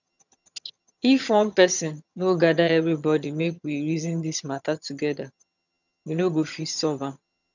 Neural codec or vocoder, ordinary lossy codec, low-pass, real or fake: vocoder, 22.05 kHz, 80 mel bands, HiFi-GAN; none; 7.2 kHz; fake